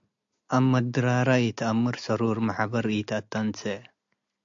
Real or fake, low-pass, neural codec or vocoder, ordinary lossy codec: real; 7.2 kHz; none; AAC, 64 kbps